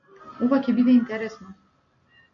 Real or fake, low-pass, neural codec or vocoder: real; 7.2 kHz; none